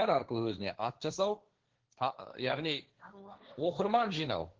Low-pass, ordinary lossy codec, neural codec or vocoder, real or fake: 7.2 kHz; Opus, 16 kbps; codec, 16 kHz, 1.1 kbps, Voila-Tokenizer; fake